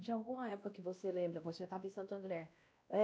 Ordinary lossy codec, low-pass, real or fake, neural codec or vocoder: none; none; fake; codec, 16 kHz, 1 kbps, X-Codec, WavLM features, trained on Multilingual LibriSpeech